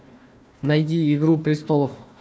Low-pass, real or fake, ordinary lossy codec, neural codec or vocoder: none; fake; none; codec, 16 kHz, 1 kbps, FunCodec, trained on Chinese and English, 50 frames a second